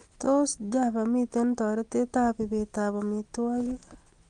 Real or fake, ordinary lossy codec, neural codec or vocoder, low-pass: real; Opus, 24 kbps; none; 9.9 kHz